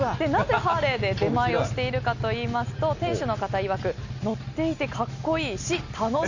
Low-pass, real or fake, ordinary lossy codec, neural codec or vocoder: 7.2 kHz; real; none; none